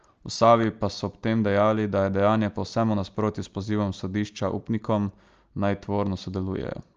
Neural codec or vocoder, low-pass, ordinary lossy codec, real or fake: none; 7.2 kHz; Opus, 24 kbps; real